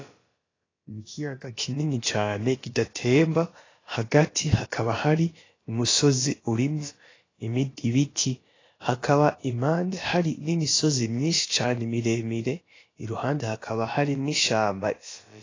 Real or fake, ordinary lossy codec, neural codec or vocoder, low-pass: fake; AAC, 32 kbps; codec, 16 kHz, about 1 kbps, DyCAST, with the encoder's durations; 7.2 kHz